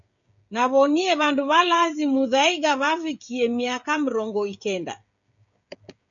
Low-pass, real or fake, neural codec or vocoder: 7.2 kHz; fake; codec, 16 kHz, 16 kbps, FreqCodec, smaller model